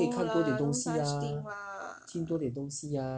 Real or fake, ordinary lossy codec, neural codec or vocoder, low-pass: real; none; none; none